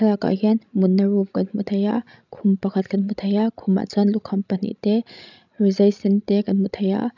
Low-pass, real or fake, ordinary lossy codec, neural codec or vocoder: 7.2 kHz; fake; none; codec, 16 kHz, 16 kbps, FreqCodec, larger model